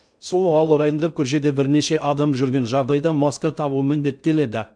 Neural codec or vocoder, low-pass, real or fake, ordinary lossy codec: codec, 16 kHz in and 24 kHz out, 0.6 kbps, FocalCodec, streaming, 4096 codes; 9.9 kHz; fake; none